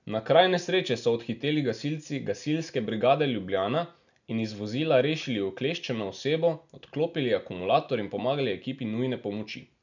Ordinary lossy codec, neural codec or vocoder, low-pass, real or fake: none; none; 7.2 kHz; real